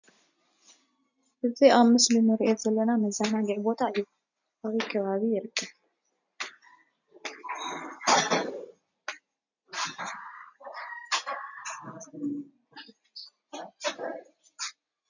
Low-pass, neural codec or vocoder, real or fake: 7.2 kHz; none; real